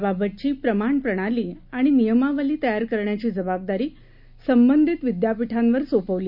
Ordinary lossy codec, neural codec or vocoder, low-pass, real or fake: none; none; 5.4 kHz; real